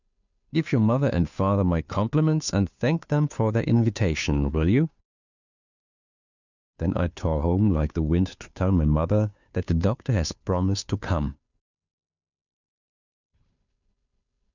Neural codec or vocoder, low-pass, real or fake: codec, 16 kHz, 2 kbps, FunCodec, trained on Chinese and English, 25 frames a second; 7.2 kHz; fake